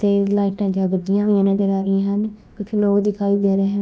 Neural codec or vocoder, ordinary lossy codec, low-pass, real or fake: codec, 16 kHz, about 1 kbps, DyCAST, with the encoder's durations; none; none; fake